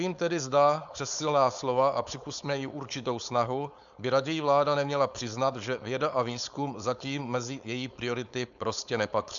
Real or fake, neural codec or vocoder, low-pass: fake; codec, 16 kHz, 4.8 kbps, FACodec; 7.2 kHz